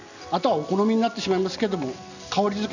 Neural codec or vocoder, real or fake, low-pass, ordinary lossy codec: none; real; 7.2 kHz; none